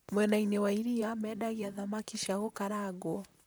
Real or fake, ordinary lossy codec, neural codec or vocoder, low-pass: fake; none; vocoder, 44.1 kHz, 128 mel bands, Pupu-Vocoder; none